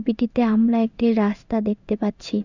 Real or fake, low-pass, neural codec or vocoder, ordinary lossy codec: fake; 7.2 kHz; codec, 16 kHz in and 24 kHz out, 1 kbps, XY-Tokenizer; none